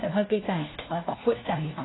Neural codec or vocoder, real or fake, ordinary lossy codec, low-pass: codec, 16 kHz, 1 kbps, FunCodec, trained on LibriTTS, 50 frames a second; fake; AAC, 16 kbps; 7.2 kHz